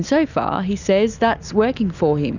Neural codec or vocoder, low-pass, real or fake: none; 7.2 kHz; real